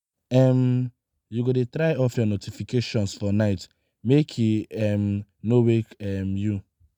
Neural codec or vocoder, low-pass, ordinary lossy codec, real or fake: none; 19.8 kHz; none; real